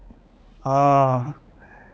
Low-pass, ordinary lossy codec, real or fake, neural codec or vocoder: none; none; fake; codec, 16 kHz, 4 kbps, X-Codec, HuBERT features, trained on balanced general audio